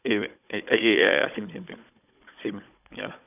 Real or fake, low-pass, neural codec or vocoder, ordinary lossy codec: fake; 3.6 kHz; codec, 16 kHz, 16 kbps, FunCodec, trained on Chinese and English, 50 frames a second; none